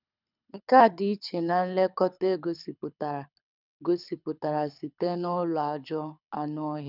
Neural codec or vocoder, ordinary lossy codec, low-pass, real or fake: codec, 24 kHz, 6 kbps, HILCodec; none; 5.4 kHz; fake